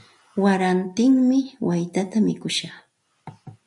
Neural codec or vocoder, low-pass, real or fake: none; 10.8 kHz; real